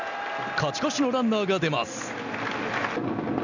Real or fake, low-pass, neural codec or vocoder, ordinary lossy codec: real; 7.2 kHz; none; none